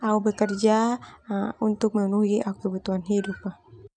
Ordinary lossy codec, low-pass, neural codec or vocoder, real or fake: none; 9.9 kHz; none; real